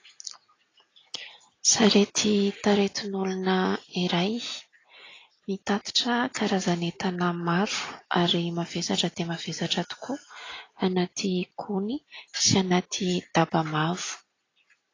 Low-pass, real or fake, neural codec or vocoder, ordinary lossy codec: 7.2 kHz; real; none; AAC, 32 kbps